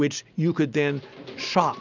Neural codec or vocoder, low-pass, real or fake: none; 7.2 kHz; real